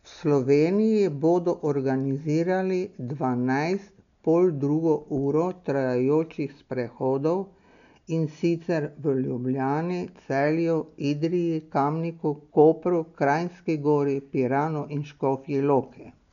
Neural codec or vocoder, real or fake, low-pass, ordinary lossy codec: none; real; 7.2 kHz; none